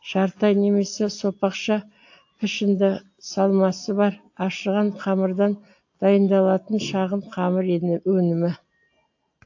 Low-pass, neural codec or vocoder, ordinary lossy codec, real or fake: 7.2 kHz; none; AAC, 48 kbps; real